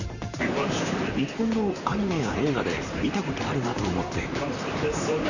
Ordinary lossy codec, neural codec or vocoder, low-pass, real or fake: none; vocoder, 44.1 kHz, 128 mel bands, Pupu-Vocoder; 7.2 kHz; fake